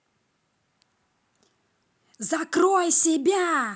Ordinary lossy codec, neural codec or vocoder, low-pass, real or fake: none; none; none; real